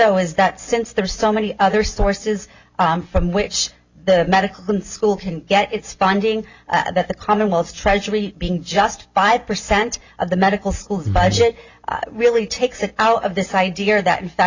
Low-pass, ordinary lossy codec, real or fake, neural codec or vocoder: 7.2 kHz; Opus, 64 kbps; real; none